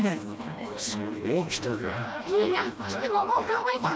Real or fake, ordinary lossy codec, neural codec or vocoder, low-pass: fake; none; codec, 16 kHz, 1 kbps, FreqCodec, smaller model; none